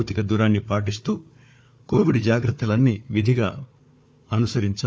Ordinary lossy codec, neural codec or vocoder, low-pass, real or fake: none; codec, 16 kHz, 4 kbps, FunCodec, trained on Chinese and English, 50 frames a second; none; fake